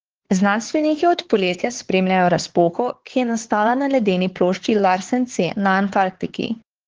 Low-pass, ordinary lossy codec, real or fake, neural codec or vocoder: 7.2 kHz; Opus, 16 kbps; fake; codec, 16 kHz, 4 kbps, X-Codec, HuBERT features, trained on LibriSpeech